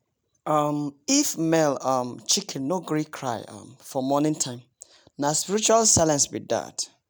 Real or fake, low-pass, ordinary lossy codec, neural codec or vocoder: real; none; none; none